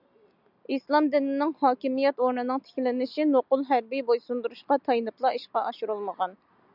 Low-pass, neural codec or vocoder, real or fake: 5.4 kHz; none; real